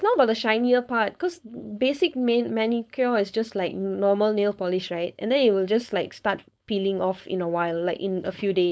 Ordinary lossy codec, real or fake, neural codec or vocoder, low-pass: none; fake; codec, 16 kHz, 4.8 kbps, FACodec; none